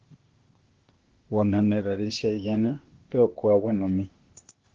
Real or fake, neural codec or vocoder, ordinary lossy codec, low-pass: fake; codec, 16 kHz, 0.8 kbps, ZipCodec; Opus, 16 kbps; 7.2 kHz